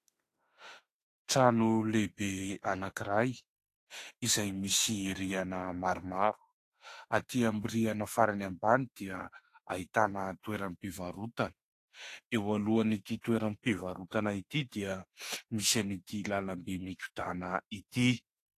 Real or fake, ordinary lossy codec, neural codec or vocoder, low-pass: fake; AAC, 48 kbps; autoencoder, 48 kHz, 32 numbers a frame, DAC-VAE, trained on Japanese speech; 14.4 kHz